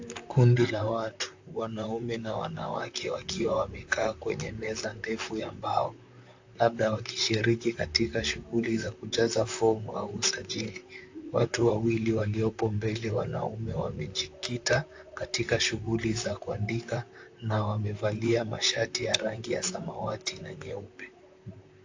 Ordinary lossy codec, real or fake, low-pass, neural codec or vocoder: AAC, 48 kbps; fake; 7.2 kHz; vocoder, 44.1 kHz, 128 mel bands, Pupu-Vocoder